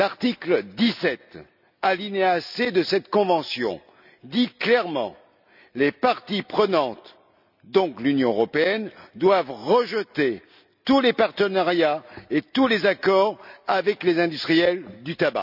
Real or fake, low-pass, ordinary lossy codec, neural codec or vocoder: real; 5.4 kHz; none; none